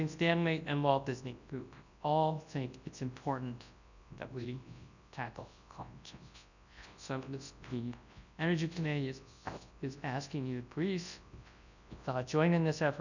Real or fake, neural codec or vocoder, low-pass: fake; codec, 24 kHz, 0.9 kbps, WavTokenizer, large speech release; 7.2 kHz